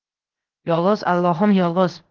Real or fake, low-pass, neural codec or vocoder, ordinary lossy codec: fake; 7.2 kHz; codec, 16 kHz, 0.7 kbps, FocalCodec; Opus, 16 kbps